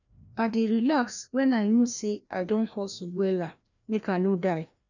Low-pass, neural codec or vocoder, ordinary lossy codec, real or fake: 7.2 kHz; codec, 16 kHz, 1 kbps, FreqCodec, larger model; none; fake